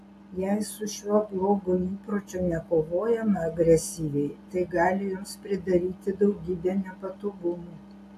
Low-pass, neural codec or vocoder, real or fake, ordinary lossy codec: 14.4 kHz; none; real; AAC, 64 kbps